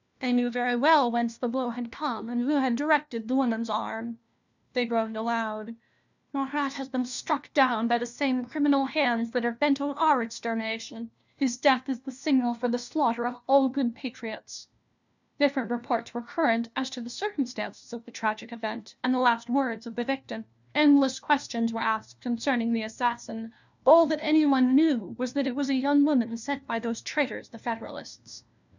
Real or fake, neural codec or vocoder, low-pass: fake; codec, 16 kHz, 1 kbps, FunCodec, trained on LibriTTS, 50 frames a second; 7.2 kHz